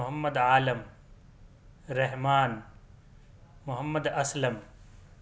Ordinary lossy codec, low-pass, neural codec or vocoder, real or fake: none; none; none; real